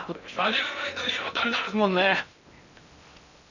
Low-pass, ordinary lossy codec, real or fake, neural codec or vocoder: 7.2 kHz; none; fake; codec, 16 kHz in and 24 kHz out, 0.6 kbps, FocalCodec, streaming, 2048 codes